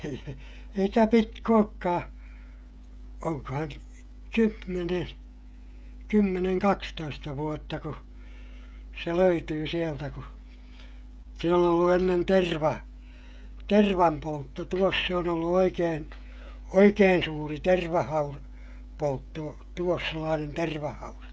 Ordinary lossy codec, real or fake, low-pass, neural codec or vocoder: none; fake; none; codec, 16 kHz, 16 kbps, FreqCodec, smaller model